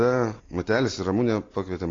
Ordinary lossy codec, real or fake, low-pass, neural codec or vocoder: AAC, 32 kbps; real; 7.2 kHz; none